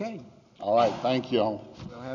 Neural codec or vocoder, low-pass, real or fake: none; 7.2 kHz; real